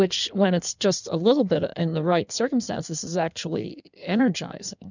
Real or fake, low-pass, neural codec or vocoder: fake; 7.2 kHz; codec, 16 kHz in and 24 kHz out, 1.1 kbps, FireRedTTS-2 codec